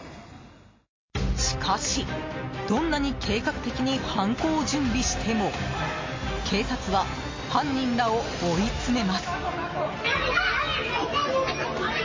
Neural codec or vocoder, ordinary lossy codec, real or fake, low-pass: none; MP3, 32 kbps; real; 7.2 kHz